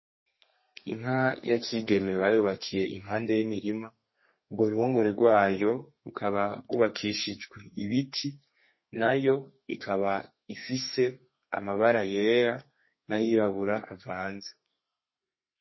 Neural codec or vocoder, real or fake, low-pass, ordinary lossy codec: codec, 32 kHz, 1.9 kbps, SNAC; fake; 7.2 kHz; MP3, 24 kbps